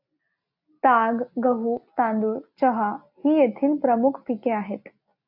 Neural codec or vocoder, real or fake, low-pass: none; real; 5.4 kHz